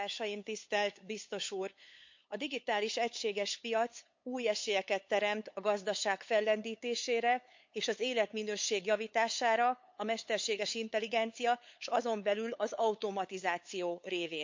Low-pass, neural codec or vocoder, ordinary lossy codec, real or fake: 7.2 kHz; codec, 16 kHz, 8 kbps, FunCodec, trained on LibriTTS, 25 frames a second; MP3, 48 kbps; fake